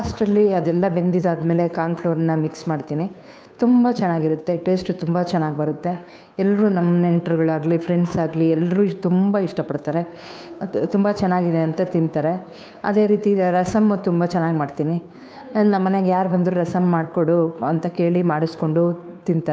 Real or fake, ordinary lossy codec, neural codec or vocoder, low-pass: fake; none; codec, 16 kHz, 2 kbps, FunCodec, trained on Chinese and English, 25 frames a second; none